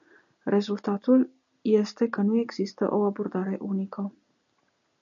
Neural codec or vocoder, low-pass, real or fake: none; 7.2 kHz; real